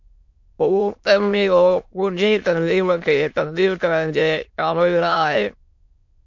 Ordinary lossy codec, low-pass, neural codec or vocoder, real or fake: MP3, 48 kbps; 7.2 kHz; autoencoder, 22.05 kHz, a latent of 192 numbers a frame, VITS, trained on many speakers; fake